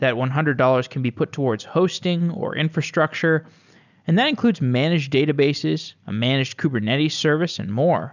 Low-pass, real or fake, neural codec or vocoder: 7.2 kHz; real; none